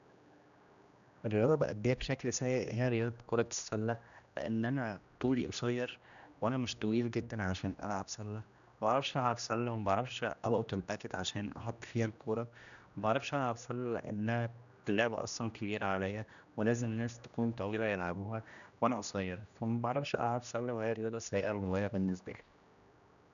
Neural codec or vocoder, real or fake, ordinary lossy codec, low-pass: codec, 16 kHz, 1 kbps, X-Codec, HuBERT features, trained on general audio; fake; none; 7.2 kHz